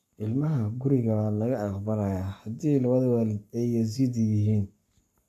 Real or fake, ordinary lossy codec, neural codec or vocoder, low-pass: fake; none; codec, 44.1 kHz, 7.8 kbps, Pupu-Codec; 14.4 kHz